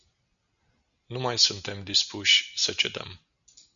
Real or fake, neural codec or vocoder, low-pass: real; none; 7.2 kHz